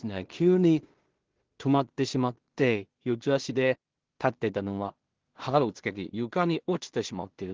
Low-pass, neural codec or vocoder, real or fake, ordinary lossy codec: 7.2 kHz; codec, 16 kHz in and 24 kHz out, 0.4 kbps, LongCat-Audio-Codec, two codebook decoder; fake; Opus, 16 kbps